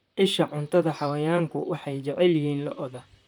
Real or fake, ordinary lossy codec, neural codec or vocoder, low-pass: fake; none; vocoder, 44.1 kHz, 128 mel bands, Pupu-Vocoder; 19.8 kHz